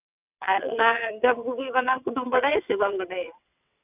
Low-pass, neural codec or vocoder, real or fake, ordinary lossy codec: 3.6 kHz; vocoder, 22.05 kHz, 80 mel bands, WaveNeXt; fake; none